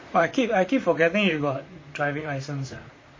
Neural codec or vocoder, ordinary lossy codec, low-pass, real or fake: vocoder, 44.1 kHz, 128 mel bands, Pupu-Vocoder; MP3, 32 kbps; 7.2 kHz; fake